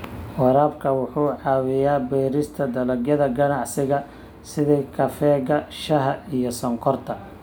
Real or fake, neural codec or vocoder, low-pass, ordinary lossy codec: real; none; none; none